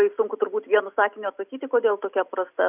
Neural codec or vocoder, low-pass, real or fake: none; 3.6 kHz; real